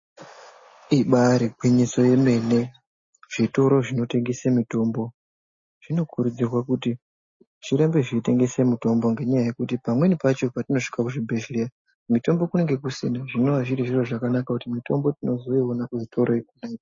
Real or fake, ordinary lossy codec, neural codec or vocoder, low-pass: real; MP3, 32 kbps; none; 7.2 kHz